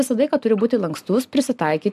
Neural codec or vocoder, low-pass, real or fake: none; 14.4 kHz; real